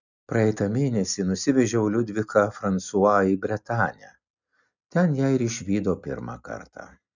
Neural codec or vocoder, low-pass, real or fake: none; 7.2 kHz; real